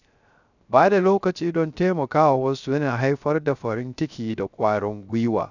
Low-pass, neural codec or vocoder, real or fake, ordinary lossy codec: 7.2 kHz; codec, 16 kHz, 0.3 kbps, FocalCodec; fake; none